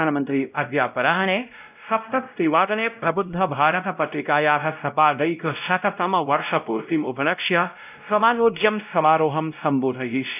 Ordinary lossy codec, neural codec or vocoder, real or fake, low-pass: none; codec, 16 kHz, 0.5 kbps, X-Codec, WavLM features, trained on Multilingual LibriSpeech; fake; 3.6 kHz